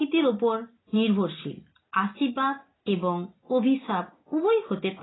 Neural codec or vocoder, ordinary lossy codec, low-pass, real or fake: none; AAC, 16 kbps; 7.2 kHz; real